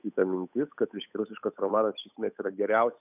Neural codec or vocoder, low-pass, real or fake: codec, 16 kHz, 8 kbps, FunCodec, trained on Chinese and English, 25 frames a second; 3.6 kHz; fake